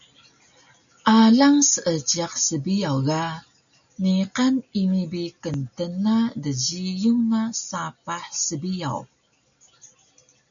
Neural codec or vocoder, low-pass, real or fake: none; 7.2 kHz; real